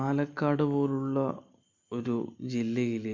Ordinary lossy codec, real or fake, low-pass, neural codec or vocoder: MP3, 48 kbps; real; 7.2 kHz; none